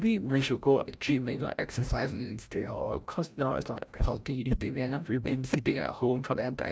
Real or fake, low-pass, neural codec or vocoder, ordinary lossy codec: fake; none; codec, 16 kHz, 0.5 kbps, FreqCodec, larger model; none